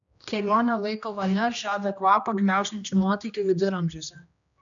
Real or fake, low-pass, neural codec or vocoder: fake; 7.2 kHz; codec, 16 kHz, 1 kbps, X-Codec, HuBERT features, trained on general audio